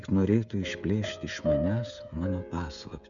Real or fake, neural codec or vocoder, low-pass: fake; codec, 16 kHz, 16 kbps, FreqCodec, smaller model; 7.2 kHz